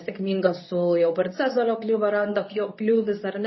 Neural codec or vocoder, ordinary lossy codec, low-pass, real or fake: codec, 24 kHz, 0.9 kbps, WavTokenizer, medium speech release version 2; MP3, 24 kbps; 7.2 kHz; fake